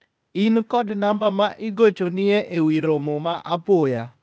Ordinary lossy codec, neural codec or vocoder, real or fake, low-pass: none; codec, 16 kHz, 0.8 kbps, ZipCodec; fake; none